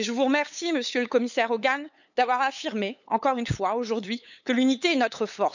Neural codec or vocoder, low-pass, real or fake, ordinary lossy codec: codec, 16 kHz, 8 kbps, FunCodec, trained on LibriTTS, 25 frames a second; 7.2 kHz; fake; none